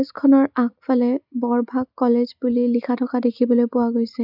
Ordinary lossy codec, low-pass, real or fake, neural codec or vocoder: none; 5.4 kHz; real; none